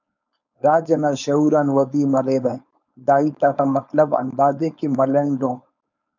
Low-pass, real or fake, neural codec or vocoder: 7.2 kHz; fake; codec, 16 kHz, 4.8 kbps, FACodec